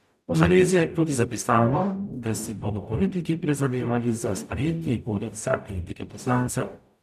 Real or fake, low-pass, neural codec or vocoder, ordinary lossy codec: fake; 14.4 kHz; codec, 44.1 kHz, 0.9 kbps, DAC; none